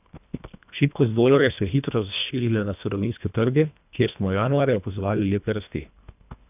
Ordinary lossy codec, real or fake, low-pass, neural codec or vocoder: none; fake; 3.6 kHz; codec, 24 kHz, 1.5 kbps, HILCodec